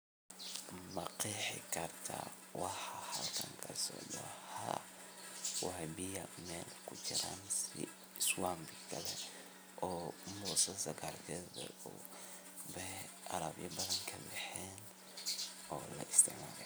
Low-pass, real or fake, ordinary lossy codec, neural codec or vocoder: none; real; none; none